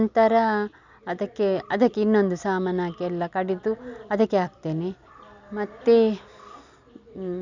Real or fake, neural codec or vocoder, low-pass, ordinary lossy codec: real; none; 7.2 kHz; none